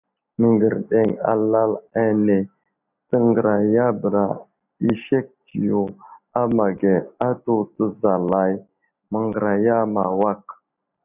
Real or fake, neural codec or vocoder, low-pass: real; none; 3.6 kHz